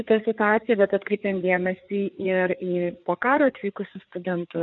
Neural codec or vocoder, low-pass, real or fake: codec, 16 kHz, 4 kbps, FreqCodec, larger model; 7.2 kHz; fake